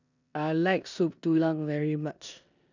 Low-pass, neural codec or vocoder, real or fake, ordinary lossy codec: 7.2 kHz; codec, 16 kHz in and 24 kHz out, 0.9 kbps, LongCat-Audio-Codec, four codebook decoder; fake; none